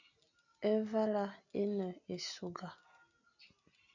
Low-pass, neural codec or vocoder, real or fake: 7.2 kHz; none; real